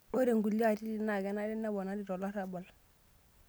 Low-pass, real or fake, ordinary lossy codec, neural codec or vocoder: none; real; none; none